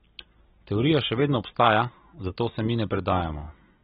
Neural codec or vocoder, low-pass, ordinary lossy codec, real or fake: none; 19.8 kHz; AAC, 16 kbps; real